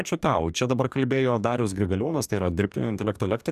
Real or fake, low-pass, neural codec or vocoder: fake; 14.4 kHz; codec, 44.1 kHz, 2.6 kbps, DAC